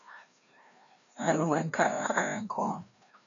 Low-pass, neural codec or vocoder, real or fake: 7.2 kHz; codec, 16 kHz, 1 kbps, FreqCodec, larger model; fake